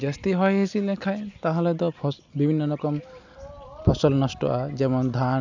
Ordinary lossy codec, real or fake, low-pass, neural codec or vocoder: none; real; 7.2 kHz; none